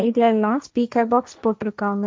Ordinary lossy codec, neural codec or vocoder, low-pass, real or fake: none; codec, 16 kHz, 1.1 kbps, Voila-Tokenizer; none; fake